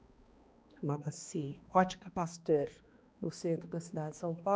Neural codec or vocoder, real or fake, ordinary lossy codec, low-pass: codec, 16 kHz, 2 kbps, X-Codec, HuBERT features, trained on balanced general audio; fake; none; none